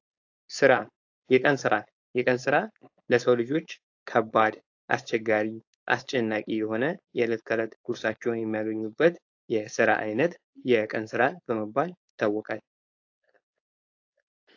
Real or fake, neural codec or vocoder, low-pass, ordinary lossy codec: fake; codec, 16 kHz, 4.8 kbps, FACodec; 7.2 kHz; AAC, 48 kbps